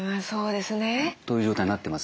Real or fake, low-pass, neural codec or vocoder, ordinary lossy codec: real; none; none; none